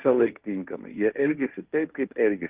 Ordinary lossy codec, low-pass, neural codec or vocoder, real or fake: Opus, 32 kbps; 3.6 kHz; codec, 16 kHz, 1.1 kbps, Voila-Tokenizer; fake